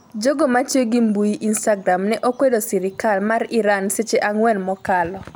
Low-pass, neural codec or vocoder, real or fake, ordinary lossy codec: none; none; real; none